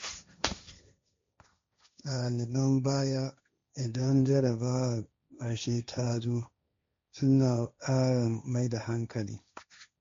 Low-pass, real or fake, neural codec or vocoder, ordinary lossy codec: 7.2 kHz; fake; codec, 16 kHz, 1.1 kbps, Voila-Tokenizer; MP3, 48 kbps